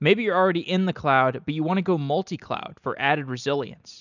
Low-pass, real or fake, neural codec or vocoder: 7.2 kHz; real; none